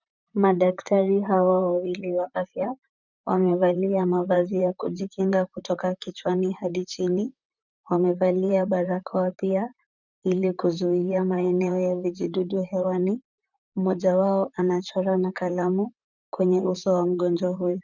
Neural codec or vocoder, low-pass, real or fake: vocoder, 44.1 kHz, 128 mel bands, Pupu-Vocoder; 7.2 kHz; fake